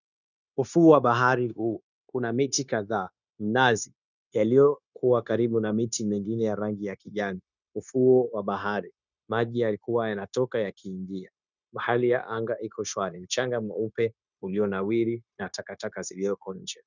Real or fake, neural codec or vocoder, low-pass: fake; codec, 16 kHz, 0.9 kbps, LongCat-Audio-Codec; 7.2 kHz